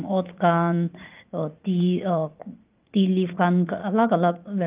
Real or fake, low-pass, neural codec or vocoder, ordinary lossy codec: fake; 3.6 kHz; vocoder, 44.1 kHz, 80 mel bands, Vocos; Opus, 24 kbps